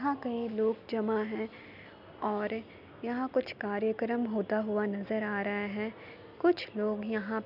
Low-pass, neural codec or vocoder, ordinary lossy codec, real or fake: 5.4 kHz; none; none; real